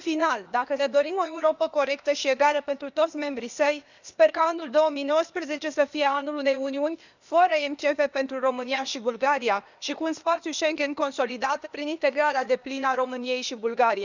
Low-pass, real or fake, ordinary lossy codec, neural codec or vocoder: 7.2 kHz; fake; none; codec, 16 kHz, 0.8 kbps, ZipCodec